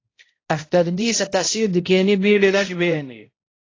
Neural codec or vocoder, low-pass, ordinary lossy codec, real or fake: codec, 16 kHz, 0.5 kbps, X-Codec, HuBERT features, trained on balanced general audio; 7.2 kHz; AAC, 32 kbps; fake